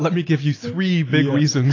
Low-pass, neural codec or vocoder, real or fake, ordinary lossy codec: 7.2 kHz; none; real; AAC, 32 kbps